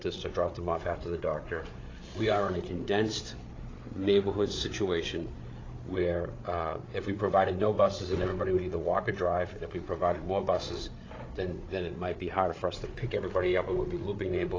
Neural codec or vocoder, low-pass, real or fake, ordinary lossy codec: codec, 16 kHz, 8 kbps, FreqCodec, larger model; 7.2 kHz; fake; AAC, 32 kbps